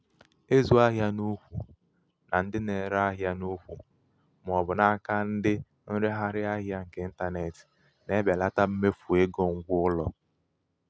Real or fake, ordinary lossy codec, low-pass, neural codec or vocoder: real; none; none; none